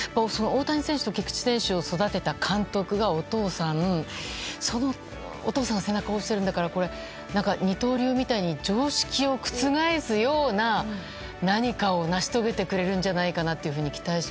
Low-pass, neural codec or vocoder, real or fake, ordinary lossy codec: none; none; real; none